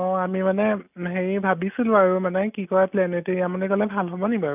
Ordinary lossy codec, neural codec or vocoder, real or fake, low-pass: none; none; real; 3.6 kHz